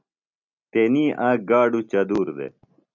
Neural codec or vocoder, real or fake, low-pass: none; real; 7.2 kHz